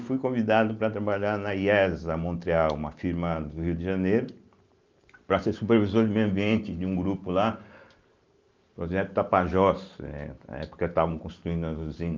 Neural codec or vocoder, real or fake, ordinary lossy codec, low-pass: none; real; Opus, 24 kbps; 7.2 kHz